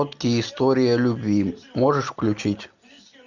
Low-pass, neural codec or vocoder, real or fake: 7.2 kHz; none; real